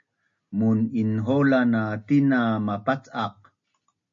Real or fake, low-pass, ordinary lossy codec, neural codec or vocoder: real; 7.2 kHz; MP3, 48 kbps; none